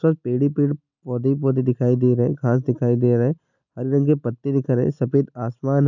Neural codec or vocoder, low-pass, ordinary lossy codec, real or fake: none; 7.2 kHz; none; real